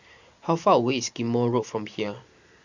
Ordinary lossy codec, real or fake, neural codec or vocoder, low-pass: Opus, 64 kbps; fake; vocoder, 44.1 kHz, 128 mel bands every 512 samples, BigVGAN v2; 7.2 kHz